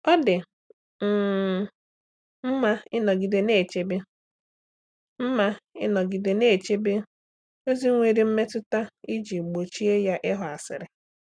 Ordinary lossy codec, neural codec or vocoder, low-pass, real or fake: none; none; 9.9 kHz; real